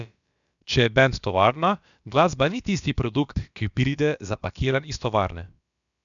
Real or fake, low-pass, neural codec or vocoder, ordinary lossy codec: fake; 7.2 kHz; codec, 16 kHz, about 1 kbps, DyCAST, with the encoder's durations; none